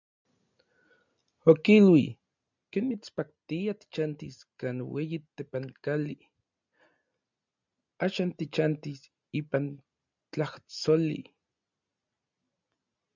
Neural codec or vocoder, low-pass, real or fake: none; 7.2 kHz; real